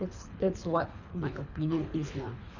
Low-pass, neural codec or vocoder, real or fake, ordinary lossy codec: 7.2 kHz; codec, 24 kHz, 3 kbps, HILCodec; fake; none